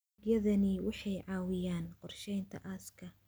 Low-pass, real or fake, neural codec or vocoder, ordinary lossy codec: none; real; none; none